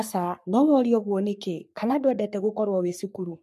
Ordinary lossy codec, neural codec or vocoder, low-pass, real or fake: MP3, 64 kbps; codec, 44.1 kHz, 3.4 kbps, Pupu-Codec; 14.4 kHz; fake